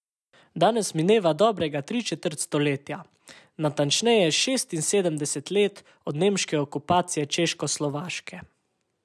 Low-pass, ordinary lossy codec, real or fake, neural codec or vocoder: none; none; real; none